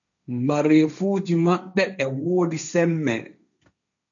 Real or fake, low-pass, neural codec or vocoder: fake; 7.2 kHz; codec, 16 kHz, 1.1 kbps, Voila-Tokenizer